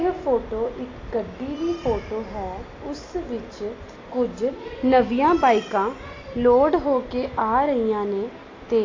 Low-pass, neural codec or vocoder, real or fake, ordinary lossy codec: 7.2 kHz; none; real; AAC, 32 kbps